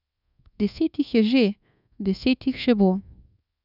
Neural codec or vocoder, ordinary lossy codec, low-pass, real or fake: codec, 24 kHz, 1.2 kbps, DualCodec; none; 5.4 kHz; fake